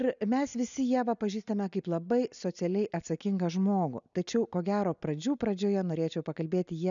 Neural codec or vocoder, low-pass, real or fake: none; 7.2 kHz; real